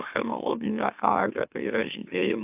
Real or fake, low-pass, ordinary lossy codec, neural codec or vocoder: fake; 3.6 kHz; AAC, 32 kbps; autoencoder, 44.1 kHz, a latent of 192 numbers a frame, MeloTTS